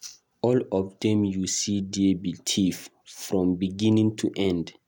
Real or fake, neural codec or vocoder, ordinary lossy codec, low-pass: real; none; none; 19.8 kHz